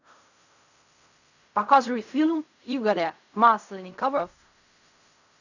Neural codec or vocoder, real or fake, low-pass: codec, 16 kHz in and 24 kHz out, 0.4 kbps, LongCat-Audio-Codec, fine tuned four codebook decoder; fake; 7.2 kHz